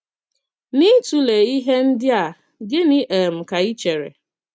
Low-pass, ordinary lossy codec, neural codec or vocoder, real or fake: none; none; none; real